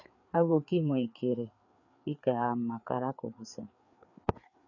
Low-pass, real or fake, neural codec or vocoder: 7.2 kHz; fake; codec, 16 kHz, 4 kbps, FreqCodec, larger model